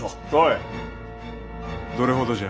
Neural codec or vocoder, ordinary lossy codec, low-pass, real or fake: none; none; none; real